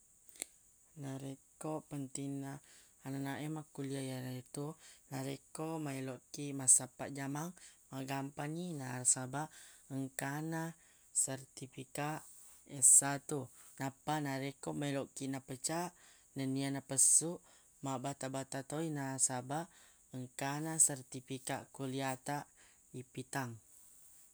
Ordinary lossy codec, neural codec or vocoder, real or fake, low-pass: none; none; real; none